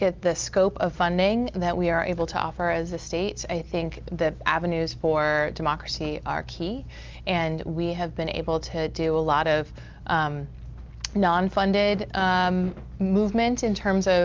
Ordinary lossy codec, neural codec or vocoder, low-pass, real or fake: Opus, 32 kbps; none; 7.2 kHz; real